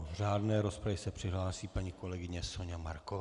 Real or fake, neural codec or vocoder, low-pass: real; none; 10.8 kHz